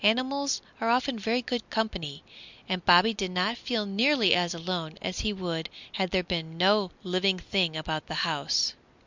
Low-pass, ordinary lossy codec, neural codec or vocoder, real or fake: 7.2 kHz; Opus, 64 kbps; none; real